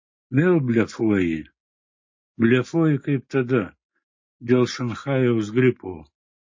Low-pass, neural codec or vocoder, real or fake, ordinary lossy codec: 7.2 kHz; none; real; MP3, 32 kbps